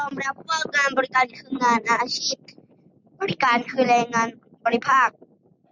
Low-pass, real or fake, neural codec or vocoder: 7.2 kHz; real; none